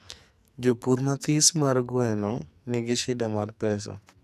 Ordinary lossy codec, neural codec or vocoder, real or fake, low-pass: none; codec, 44.1 kHz, 2.6 kbps, SNAC; fake; 14.4 kHz